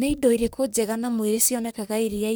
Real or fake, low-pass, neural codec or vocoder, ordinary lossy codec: fake; none; codec, 44.1 kHz, 3.4 kbps, Pupu-Codec; none